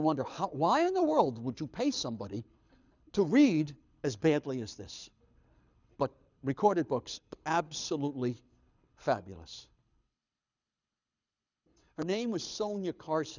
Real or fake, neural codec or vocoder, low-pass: fake; codec, 24 kHz, 6 kbps, HILCodec; 7.2 kHz